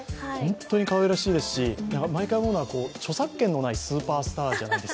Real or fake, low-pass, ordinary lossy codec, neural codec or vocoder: real; none; none; none